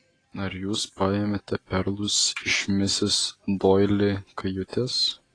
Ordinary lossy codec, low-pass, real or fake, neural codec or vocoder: AAC, 32 kbps; 9.9 kHz; real; none